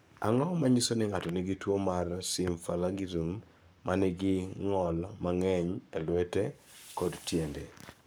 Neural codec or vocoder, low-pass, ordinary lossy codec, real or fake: codec, 44.1 kHz, 7.8 kbps, Pupu-Codec; none; none; fake